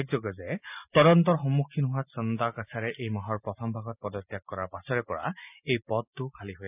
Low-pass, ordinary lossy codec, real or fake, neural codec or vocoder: 3.6 kHz; none; real; none